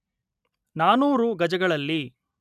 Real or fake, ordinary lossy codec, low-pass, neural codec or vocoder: real; none; 14.4 kHz; none